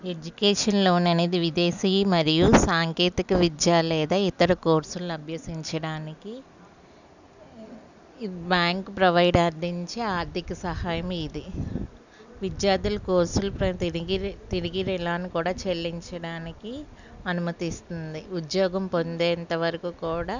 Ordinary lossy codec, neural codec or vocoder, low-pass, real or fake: none; none; 7.2 kHz; real